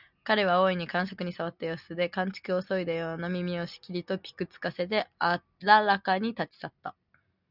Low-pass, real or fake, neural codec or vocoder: 5.4 kHz; real; none